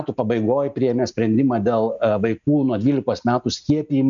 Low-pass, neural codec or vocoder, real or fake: 7.2 kHz; none; real